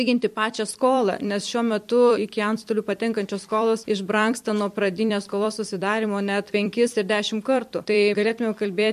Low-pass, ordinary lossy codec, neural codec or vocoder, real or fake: 14.4 kHz; MP3, 64 kbps; vocoder, 44.1 kHz, 128 mel bands every 256 samples, BigVGAN v2; fake